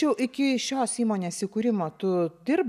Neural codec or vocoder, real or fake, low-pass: none; real; 14.4 kHz